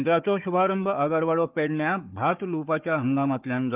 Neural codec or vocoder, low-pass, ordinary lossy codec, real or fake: codec, 16 kHz, 4 kbps, FunCodec, trained on Chinese and English, 50 frames a second; 3.6 kHz; Opus, 32 kbps; fake